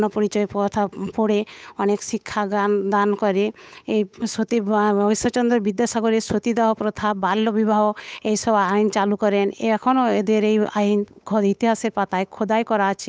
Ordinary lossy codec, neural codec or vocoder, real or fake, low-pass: none; codec, 16 kHz, 8 kbps, FunCodec, trained on Chinese and English, 25 frames a second; fake; none